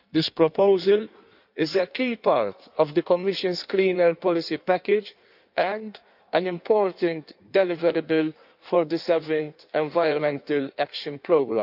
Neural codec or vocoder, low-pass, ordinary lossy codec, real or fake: codec, 16 kHz in and 24 kHz out, 1.1 kbps, FireRedTTS-2 codec; 5.4 kHz; none; fake